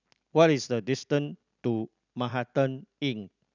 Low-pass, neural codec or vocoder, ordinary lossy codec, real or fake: 7.2 kHz; none; none; real